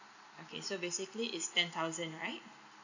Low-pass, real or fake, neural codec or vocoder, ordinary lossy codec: 7.2 kHz; real; none; none